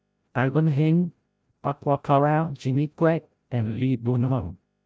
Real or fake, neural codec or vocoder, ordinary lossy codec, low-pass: fake; codec, 16 kHz, 0.5 kbps, FreqCodec, larger model; none; none